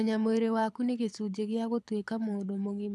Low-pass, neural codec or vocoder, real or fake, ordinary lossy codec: none; codec, 24 kHz, 6 kbps, HILCodec; fake; none